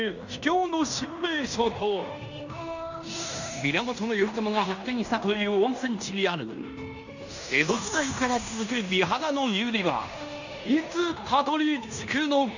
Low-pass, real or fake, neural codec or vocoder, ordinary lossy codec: 7.2 kHz; fake; codec, 16 kHz in and 24 kHz out, 0.9 kbps, LongCat-Audio-Codec, fine tuned four codebook decoder; none